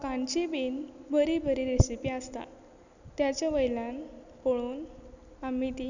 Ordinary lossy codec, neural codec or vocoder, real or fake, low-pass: none; none; real; 7.2 kHz